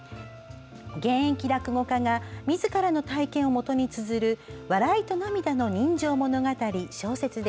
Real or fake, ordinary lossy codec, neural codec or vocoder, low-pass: real; none; none; none